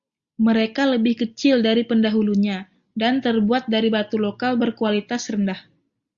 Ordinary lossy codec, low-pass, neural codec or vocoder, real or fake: Opus, 64 kbps; 7.2 kHz; none; real